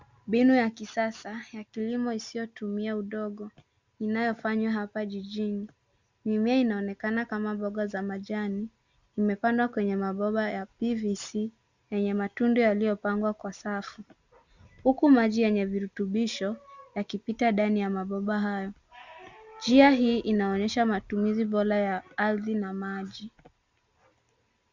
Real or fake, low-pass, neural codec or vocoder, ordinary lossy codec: real; 7.2 kHz; none; Opus, 64 kbps